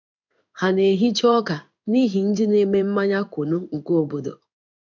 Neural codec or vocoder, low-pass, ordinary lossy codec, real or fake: codec, 16 kHz in and 24 kHz out, 1 kbps, XY-Tokenizer; 7.2 kHz; none; fake